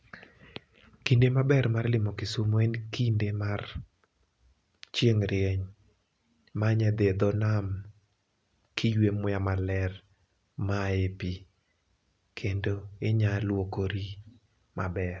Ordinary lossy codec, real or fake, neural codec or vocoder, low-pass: none; real; none; none